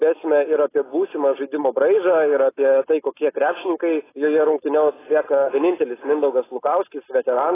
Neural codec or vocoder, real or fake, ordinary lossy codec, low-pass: none; real; AAC, 16 kbps; 3.6 kHz